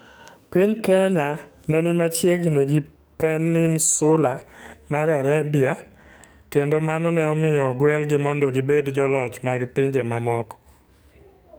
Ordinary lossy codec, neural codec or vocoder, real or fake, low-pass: none; codec, 44.1 kHz, 2.6 kbps, SNAC; fake; none